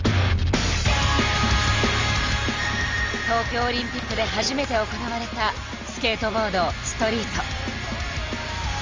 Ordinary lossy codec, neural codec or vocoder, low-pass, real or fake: Opus, 32 kbps; none; 7.2 kHz; real